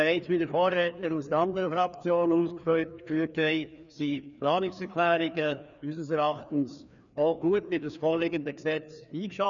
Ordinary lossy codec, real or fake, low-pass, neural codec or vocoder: none; fake; 7.2 kHz; codec, 16 kHz, 2 kbps, FreqCodec, larger model